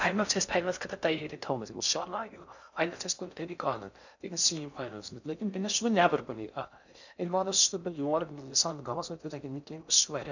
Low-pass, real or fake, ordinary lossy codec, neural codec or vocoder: 7.2 kHz; fake; none; codec, 16 kHz in and 24 kHz out, 0.6 kbps, FocalCodec, streaming, 4096 codes